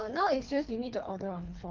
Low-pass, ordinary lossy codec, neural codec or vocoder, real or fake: 7.2 kHz; Opus, 24 kbps; codec, 24 kHz, 3 kbps, HILCodec; fake